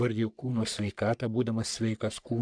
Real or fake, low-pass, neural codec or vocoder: fake; 9.9 kHz; codec, 44.1 kHz, 3.4 kbps, Pupu-Codec